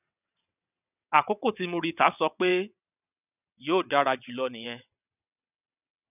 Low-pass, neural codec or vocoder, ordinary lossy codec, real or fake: 3.6 kHz; vocoder, 22.05 kHz, 80 mel bands, WaveNeXt; none; fake